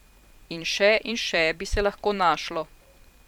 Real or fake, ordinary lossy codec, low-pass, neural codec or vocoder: fake; none; 19.8 kHz; vocoder, 44.1 kHz, 128 mel bands every 512 samples, BigVGAN v2